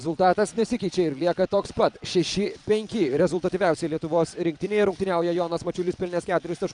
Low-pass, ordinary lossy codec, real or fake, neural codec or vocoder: 9.9 kHz; MP3, 96 kbps; fake; vocoder, 22.05 kHz, 80 mel bands, Vocos